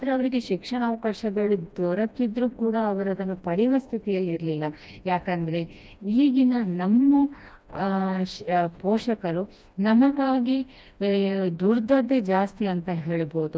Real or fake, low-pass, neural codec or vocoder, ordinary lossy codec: fake; none; codec, 16 kHz, 1 kbps, FreqCodec, smaller model; none